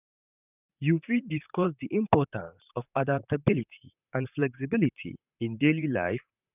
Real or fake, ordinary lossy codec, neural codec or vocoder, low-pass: fake; none; vocoder, 44.1 kHz, 128 mel bands, Pupu-Vocoder; 3.6 kHz